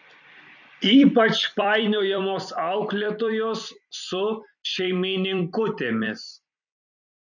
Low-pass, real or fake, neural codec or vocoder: 7.2 kHz; fake; vocoder, 44.1 kHz, 128 mel bands every 256 samples, BigVGAN v2